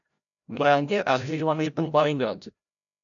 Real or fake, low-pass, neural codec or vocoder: fake; 7.2 kHz; codec, 16 kHz, 0.5 kbps, FreqCodec, larger model